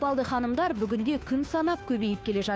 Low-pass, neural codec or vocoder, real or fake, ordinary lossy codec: none; codec, 16 kHz, 2 kbps, FunCodec, trained on Chinese and English, 25 frames a second; fake; none